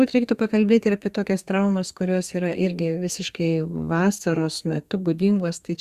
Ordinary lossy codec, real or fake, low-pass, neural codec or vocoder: Opus, 64 kbps; fake; 14.4 kHz; codec, 32 kHz, 1.9 kbps, SNAC